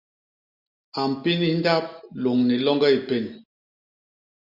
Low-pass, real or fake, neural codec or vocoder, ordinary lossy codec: 5.4 kHz; real; none; Opus, 64 kbps